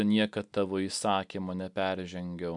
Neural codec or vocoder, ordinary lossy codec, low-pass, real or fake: none; MP3, 96 kbps; 10.8 kHz; real